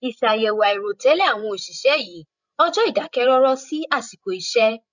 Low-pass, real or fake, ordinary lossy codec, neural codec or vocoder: 7.2 kHz; fake; none; codec, 16 kHz, 16 kbps, FreqCodec, larger model